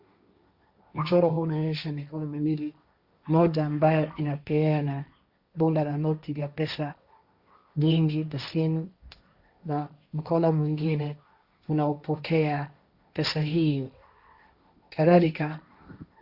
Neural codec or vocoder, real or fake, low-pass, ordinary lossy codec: codec, 16 kHz, 1.1 kbps, Voila-Tokenizer; fake; 5.4 kHz; Opus, 64 kbps